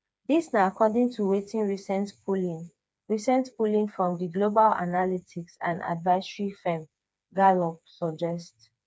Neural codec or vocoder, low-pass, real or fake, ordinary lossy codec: codec, 16 kHz, 4 kbps, FreqCodec, smaller model; none; fake; none